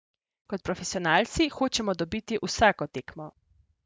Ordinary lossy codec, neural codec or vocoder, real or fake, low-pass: none; none; real; none